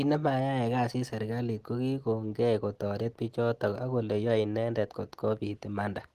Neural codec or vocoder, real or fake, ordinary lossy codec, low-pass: none; real; Opus, 16 kbps; 14.4 kHz